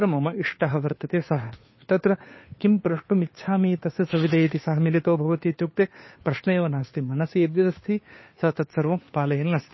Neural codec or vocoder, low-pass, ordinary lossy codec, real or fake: codec, 16 kHz, 2 kbps, FunCodec, trained on Chinese and English, 25 frames a second; 7.2 kHz; MP3, 24 kbps; fake